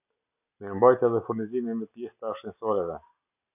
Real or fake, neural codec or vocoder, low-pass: real; none; 3.6 kHz